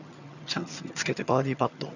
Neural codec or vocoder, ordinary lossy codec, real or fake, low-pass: vocoder, 22.05 kHz, 80 mel bands, HiFi-GAN; none; fake; 7.2 kHz